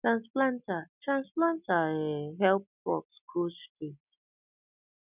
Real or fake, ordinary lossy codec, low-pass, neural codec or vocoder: real; none; 3.6 kHz; none